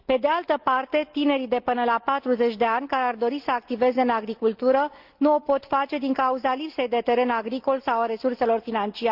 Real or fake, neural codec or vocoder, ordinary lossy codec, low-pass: real; none; Opus, 32 kbps; 5.4 kHz